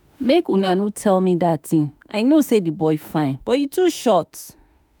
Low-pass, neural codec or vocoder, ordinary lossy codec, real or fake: none; autoencoder, 48 kHz, 32 numbers a frame, DAC-VAE, trained on Japanese speech; none; fake